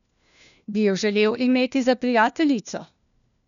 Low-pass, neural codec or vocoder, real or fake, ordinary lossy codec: 7.2 kHz; codec, 16 kHz, 1 kbps, FunCodec, trained on LibriTTS, 50 frames a second; fake; none